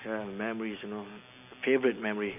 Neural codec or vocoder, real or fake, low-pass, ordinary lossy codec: none; real; 3.6 kHz; none